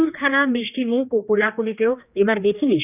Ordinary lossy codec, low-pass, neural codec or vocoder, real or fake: none; 3.6 kHz; codec, 16 kHz, 2 kbps, X-Codec, HuBERT features, trained on general audio; fake